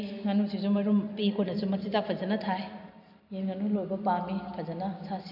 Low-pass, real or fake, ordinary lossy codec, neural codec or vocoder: 5.4 kHz; real; none; none